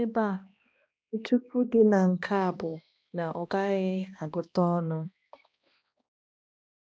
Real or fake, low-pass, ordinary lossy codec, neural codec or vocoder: fake; none; none; codec, 16 kHz, 1 kbps, X-Codec, HuBERT features, trained on balanced general audio